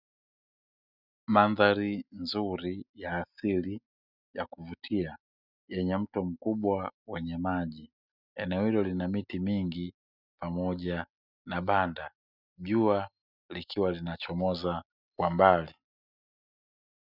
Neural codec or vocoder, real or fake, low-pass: none; real; 5.4 kHz